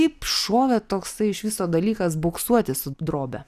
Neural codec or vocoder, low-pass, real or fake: none; 14.4 kHz; real